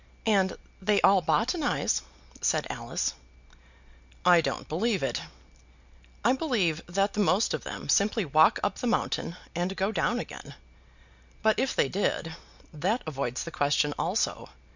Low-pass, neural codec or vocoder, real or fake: 7.2 kHz; none; real